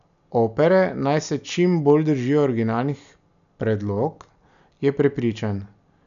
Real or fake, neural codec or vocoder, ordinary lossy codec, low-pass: real; none; none; 7.2 kHz